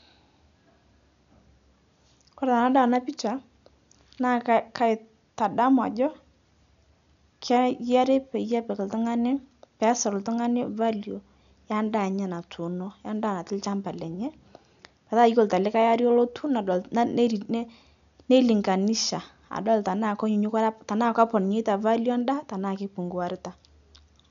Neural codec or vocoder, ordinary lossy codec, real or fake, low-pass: none; none; real; 7.2 kHz